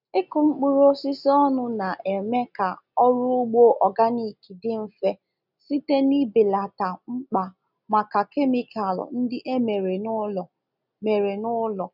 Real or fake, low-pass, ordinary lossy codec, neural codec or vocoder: real; 5.4 kHz; none; none